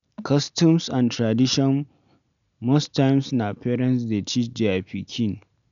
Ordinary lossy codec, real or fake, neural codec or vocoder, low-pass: none; real; none; 7.2 kHz